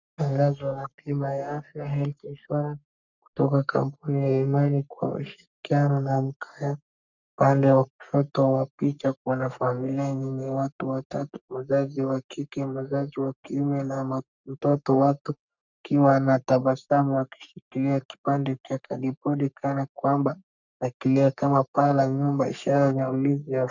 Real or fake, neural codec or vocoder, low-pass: fake; codec, 44.1 kHz, 3.4 kbps, Pupu-Codec; 7.2 kHz